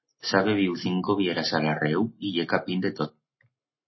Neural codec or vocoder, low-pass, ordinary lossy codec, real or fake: none; 7.2 kHz; MP3, 24 kbps; real